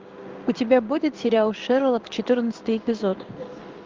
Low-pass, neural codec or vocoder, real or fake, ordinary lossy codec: 7.2 kHz; codec, 16 kHz in and 24 kHz out, 1 kbps, XY-Tokenizer; fake; Opus, 32 kbps